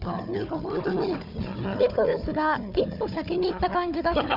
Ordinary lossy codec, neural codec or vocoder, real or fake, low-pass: none; codec, 16 kHz, 4 kbps, FunCodec, trained on Chinese and English, 50 frames a second; fake; 5.4 kHz